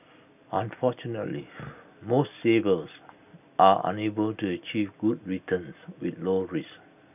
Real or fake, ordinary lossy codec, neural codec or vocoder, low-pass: real; none; none; 3.6 kHz